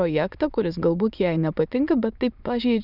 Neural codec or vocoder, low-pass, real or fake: autoencoder, 22.05 kHz, a latent of 192 numbers a frame, VITS, trained on many speakers; 5.4 kHz; fake